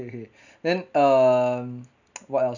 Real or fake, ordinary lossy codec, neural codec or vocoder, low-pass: real; none; none; 7.2 kHz